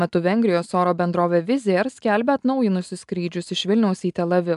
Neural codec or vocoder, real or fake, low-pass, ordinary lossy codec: none; real; 10.8 kHz; AAC, 96 kbps